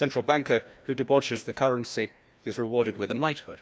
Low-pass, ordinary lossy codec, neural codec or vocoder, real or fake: none; none; codec, 16 kHz, 1 kbps, FreqCodec, larger model; fake